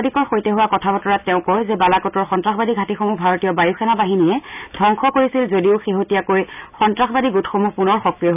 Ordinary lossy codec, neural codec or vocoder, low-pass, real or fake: none; none; 3.6 kHz; real